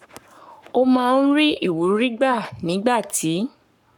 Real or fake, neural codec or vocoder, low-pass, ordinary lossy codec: fake; codec, 44.1 kHz, 7.8 kbps, Pupu-Codec; 19.8 kHz; none